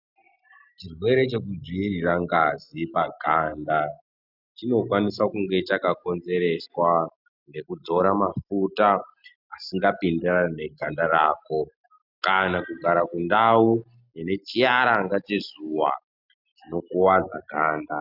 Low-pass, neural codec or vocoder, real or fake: 5.4 kHz; none; real